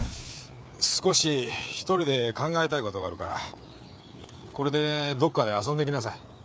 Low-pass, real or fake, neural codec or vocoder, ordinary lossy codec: none; fake; codec, 16 kHz, 4 kbps, FreqCodec, larger model; none